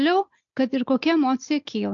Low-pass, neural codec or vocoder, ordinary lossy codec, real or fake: 7.2 kHz; none; AAC, 64 kbps; real